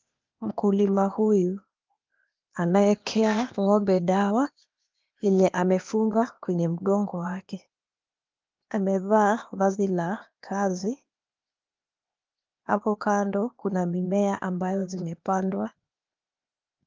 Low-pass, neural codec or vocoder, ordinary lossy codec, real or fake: 7.2 kHz; codec, 16 kHz, 0.8 kbps, ZipCodec; Opus, 32 kbps; fake